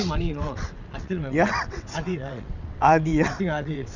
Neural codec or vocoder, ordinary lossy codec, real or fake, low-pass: vocoder, 22.05 kHz, 80 mel bands, WaveNeXt; none; fake; 7.2 kHz